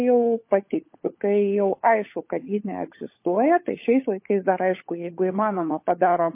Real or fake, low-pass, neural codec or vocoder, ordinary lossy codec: fake; 3.6 kHz; codec, 16 kHz, 16 kbps, FunCodec, trained on LibriTTS, 50 frames a second; MP3, 24 kbps